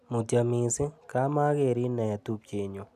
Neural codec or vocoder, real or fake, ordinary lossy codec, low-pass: none; real; none; 14.4 kHz